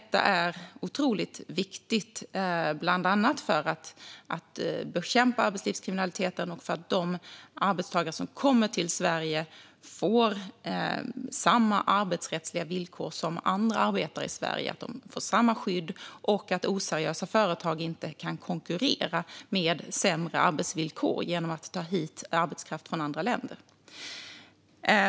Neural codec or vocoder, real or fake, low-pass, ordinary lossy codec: none; real; none; none